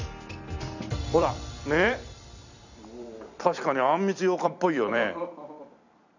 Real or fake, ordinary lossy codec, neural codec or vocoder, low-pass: fake; none; vocoder, 44.1 kHz, 128 mel bands every 256 samples, BigVGAN v2; 7.2 kHz